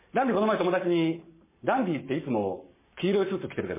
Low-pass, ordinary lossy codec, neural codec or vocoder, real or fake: 3.6 kHz; MP3, 16 kbps; codec, 44.1 kHz, 7.8 kbps, Pupu-Codec; fake